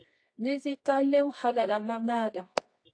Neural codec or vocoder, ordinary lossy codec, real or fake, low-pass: codec, 24 kHz, 0.9 kbps, WavTokenizer, medium music audio release; AAC, 48 kbps; fake; 9.9 kHz